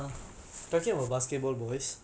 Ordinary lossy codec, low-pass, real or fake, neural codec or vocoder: none; none; real; none